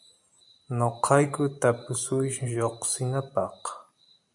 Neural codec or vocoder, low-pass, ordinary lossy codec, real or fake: vocoder, 44.1 kHz, 128 mel bands every 512 samples, BigVGAN v2; 10.8 kHz; MP3, 96 kbps; fake